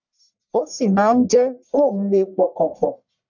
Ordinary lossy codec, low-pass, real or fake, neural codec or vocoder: none; 7.2 kHz; fake; codec, 44.1 kHz, 1.7 kbps, Pupu-Codec